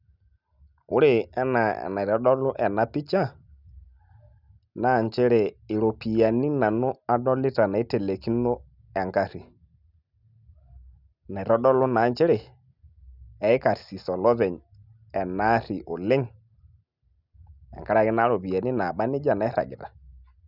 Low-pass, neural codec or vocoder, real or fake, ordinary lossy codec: 5.4 kHz; none; real; none